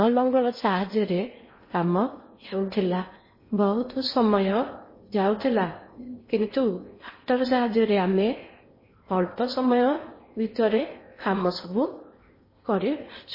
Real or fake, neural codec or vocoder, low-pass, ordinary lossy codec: fake; codec, 16 kHz in and 24 kHz out, 0.8 kbps, FocalCodec, streaming, 65536 codes; 5.4 kHz; MP3, 24 kbps